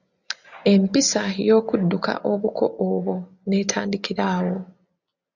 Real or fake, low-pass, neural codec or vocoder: real; 7.2 kHz; none